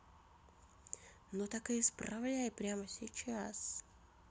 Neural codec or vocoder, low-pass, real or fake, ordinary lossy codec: none; none; real; none